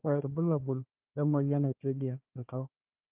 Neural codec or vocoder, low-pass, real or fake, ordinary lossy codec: codec, 16 kHz, 1 kbps, FunCodec, trained on Chinese and English, 50 frames a second; 3.6 kHz; fake; Opus, 32 kbps